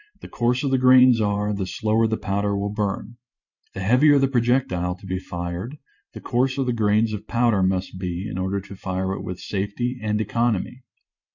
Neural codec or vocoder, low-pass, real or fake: vocoder, 44.1 kHz, 128 mel bands every 512 samples, BigVGAN v2; 7.2 kHz; fake